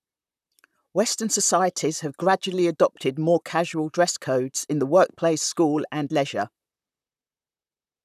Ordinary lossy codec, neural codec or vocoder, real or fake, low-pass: none; vocoder, 44.1 kHz, 128 mel bands, Pupu-Vocoder; fake; 14.4 kHz